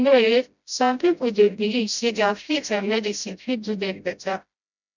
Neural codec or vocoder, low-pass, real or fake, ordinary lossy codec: codec, 16 kHz, 0.5 kbps, FreqCodec, smaller model; 7.2 kHz; fake; none